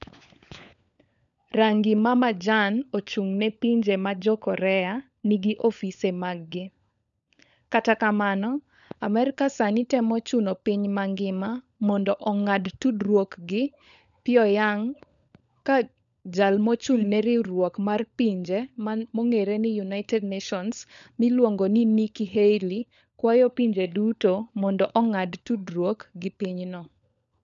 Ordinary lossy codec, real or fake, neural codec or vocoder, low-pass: none; fake; codec, 16 kHz, 16 kbps, FunCodec, trained on LibriTTS, 50 frames a second; 7.2 kHz